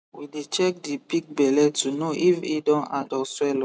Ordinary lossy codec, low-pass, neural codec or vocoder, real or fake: none; none; none; real